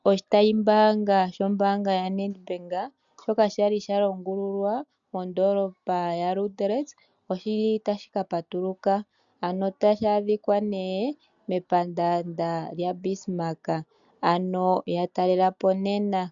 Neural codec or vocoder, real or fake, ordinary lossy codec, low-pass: none; real; MP3, 96 kbps; 7.2 kHz